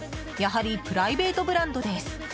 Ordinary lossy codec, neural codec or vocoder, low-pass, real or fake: none; none; none; real